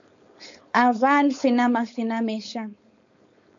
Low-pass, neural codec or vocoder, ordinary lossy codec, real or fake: 7.2 kHz; codec, 16 kHz, 4.8 kbps, FACodec; AAC, 64 kbps; fake